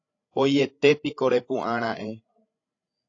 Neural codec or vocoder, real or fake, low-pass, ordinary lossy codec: codec, 16 kHz, 16 kbps, FreqCodec, larger model; fake; 7.2 kHz; AAC, 32 kbps